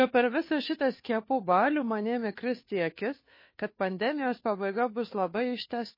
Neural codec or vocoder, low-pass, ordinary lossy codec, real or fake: codec, 16 kHz, 2 kbps, FunCodec, trained on Chinese and English, 25 frames a second; 5.4 kHz; MP3, 24 kbps; fake